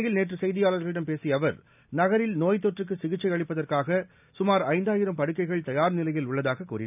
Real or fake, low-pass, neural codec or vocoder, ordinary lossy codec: real; 3.6 kHz; none; none